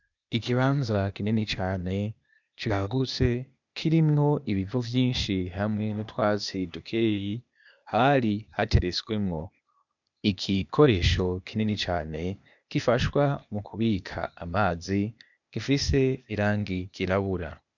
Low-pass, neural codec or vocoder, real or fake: 7.2 kHz; codec, 16 kHz, 0.8 kbps, ZipCodec; fake